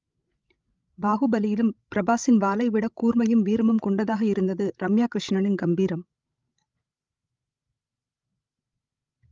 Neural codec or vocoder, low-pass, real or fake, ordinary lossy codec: codec, 16 kHz, 16 kbps, FreqCodec, larger model; 7.2 kHz; fake; Opus, 32 kbps